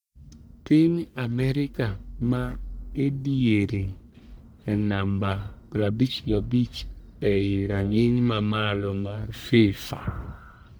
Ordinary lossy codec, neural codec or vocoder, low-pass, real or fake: none; codec, 44.1 kHz, 1.7 kbps, Pupu-Codec; none; fake